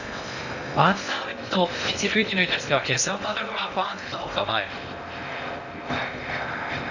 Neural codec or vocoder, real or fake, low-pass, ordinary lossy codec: codec, 16 kHz in and 24 kHz out, 0.6 kbps, FocalCodec, streaming, 2048 codes; fake; 7.2 kHz; none